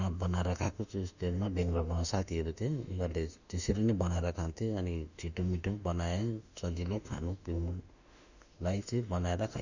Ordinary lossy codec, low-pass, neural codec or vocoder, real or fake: none; 7.2 kHz; autoencoder, 48 kHz, 32 numbers a frame, DAC-VAE, trained on Japanese speech; fake